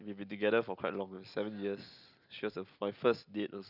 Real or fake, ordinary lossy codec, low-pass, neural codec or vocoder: real; none; 5.4 kHz; none